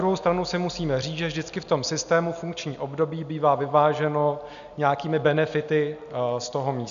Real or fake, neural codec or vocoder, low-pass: real; none; 7.2 kHz